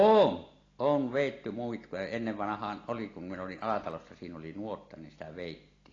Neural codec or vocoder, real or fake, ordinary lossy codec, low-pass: none; real; AAC, 32 kbps; 7.2 kHz